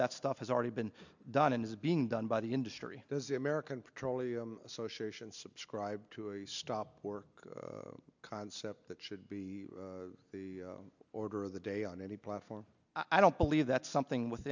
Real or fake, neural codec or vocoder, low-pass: real; none; 7.2 kHz